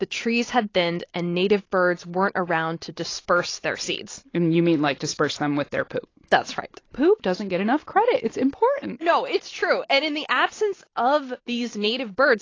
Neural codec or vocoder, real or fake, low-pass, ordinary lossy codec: none; real; 7.2 kHz; AAC, 32 kbps